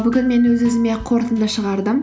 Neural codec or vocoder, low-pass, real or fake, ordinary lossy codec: none; none; real; none